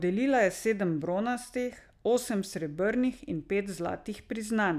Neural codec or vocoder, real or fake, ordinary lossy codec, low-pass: none; real; none; 14.4 kHz